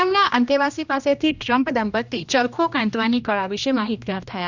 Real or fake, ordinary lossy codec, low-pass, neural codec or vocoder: fake; none; 7.2 kHz; codec, 16 kHz, 1 kbps, X-Codec, HuBERT features, trained on general audio